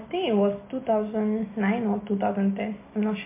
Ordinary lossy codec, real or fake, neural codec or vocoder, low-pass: MP3, 24 kbps; real; none; 3.6 kHz